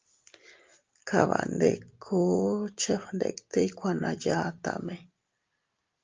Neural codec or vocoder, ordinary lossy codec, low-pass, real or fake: none; Opus, 24 kbps; 7.2 kHz; real